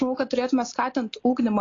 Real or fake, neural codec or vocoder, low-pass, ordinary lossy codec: real; none; 7.2 kHz; AAC, 32 kbps